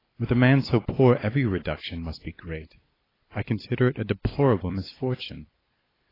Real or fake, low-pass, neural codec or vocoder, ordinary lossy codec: fake; 5.4 kHz; vocoder, 44.1 kHz, 128 mel bands every 256 samples, BigVGAN v2; AAC, 24 kbps